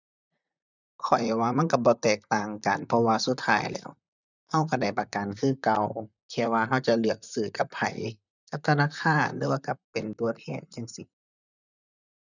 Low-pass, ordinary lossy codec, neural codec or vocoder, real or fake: 7.2 kHz; none; vocoder, 44.1 kHz, 128 mel bands, Pupu-Vocoder; fake